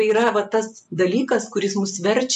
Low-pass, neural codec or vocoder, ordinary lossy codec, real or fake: 10.8 kHz; none; MP3, 96 kbps; real